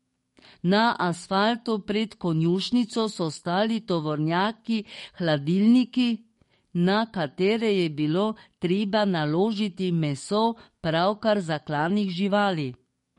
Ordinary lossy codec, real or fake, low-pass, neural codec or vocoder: MP3, 48 kbps; fake; 19.8 kHz; codec, 44.1 kHz, 7.8 kbps, DAC